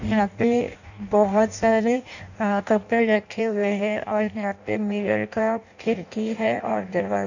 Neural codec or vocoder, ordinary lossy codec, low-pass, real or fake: codec, 16 kHz in and 24 kHz out, 0.6 kbps, FireRedTTS-2 codec; none; 7.2 kHz; fake